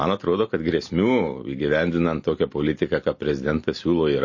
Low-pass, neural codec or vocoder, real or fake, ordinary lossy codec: 7.2 kHz; none; real; MP3, 32 kbps